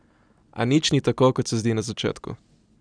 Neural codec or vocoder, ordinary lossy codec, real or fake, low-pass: none; none; real; 9.9 kHz